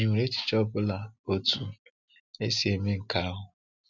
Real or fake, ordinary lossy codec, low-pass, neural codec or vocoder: real; none; 7.2 kHz; none